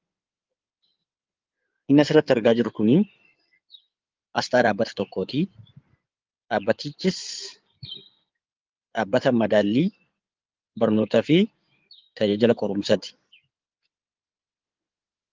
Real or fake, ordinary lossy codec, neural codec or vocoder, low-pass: fake; Opus, 32 kbps; codec, 16 kHz in and 24 kHz out, 2.2 kbps, FireRedTTS-2 codec; 7.2 kHz